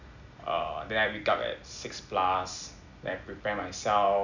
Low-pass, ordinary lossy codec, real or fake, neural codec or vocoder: 7.2 kHz; none; real; none